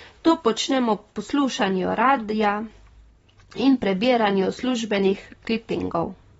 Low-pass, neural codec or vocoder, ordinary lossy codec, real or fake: 19.8 kHz; vocoder, 44.1 kHz, 128 mel bands, Pupu-Vocoder; AAC, 24 kbps; fake